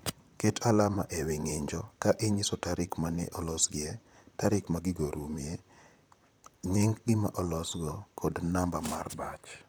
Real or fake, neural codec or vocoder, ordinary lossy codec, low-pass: fake; vocoder, 44.1 kHz, 128 mel bands, Pupu-Vocoder; none; none